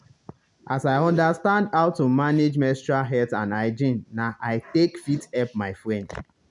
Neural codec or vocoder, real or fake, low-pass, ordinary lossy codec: none; real; 10.8 kHz; none